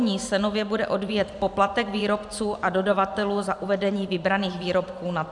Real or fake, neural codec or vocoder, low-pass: fake; vocoder, 44.1 kHz, 128 mel bands every 512 samples, BigVGAN v2; 10.8 kHz